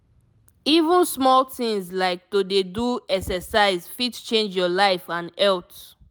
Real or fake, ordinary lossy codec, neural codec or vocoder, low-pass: real; none; none; none